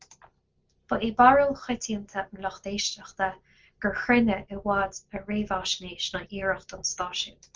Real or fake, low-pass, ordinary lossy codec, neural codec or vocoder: real; 7.2 kHz; Opus, 32 kbps; none